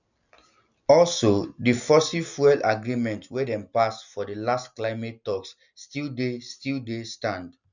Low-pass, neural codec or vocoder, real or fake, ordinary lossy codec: 7.2 kHz; none; real; none